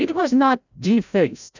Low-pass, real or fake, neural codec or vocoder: 7.2 kHz; fake; codec, 16 kHz, 0.5 kbps, FreqCodec, larger model